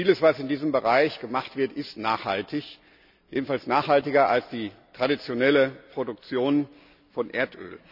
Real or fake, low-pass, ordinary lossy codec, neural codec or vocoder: real; 5.4 kHz; none; none